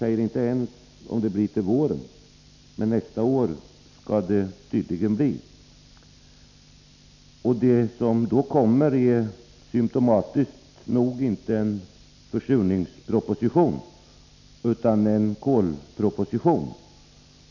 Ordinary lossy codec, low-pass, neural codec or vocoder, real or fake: none; 7.2 kHz; none; real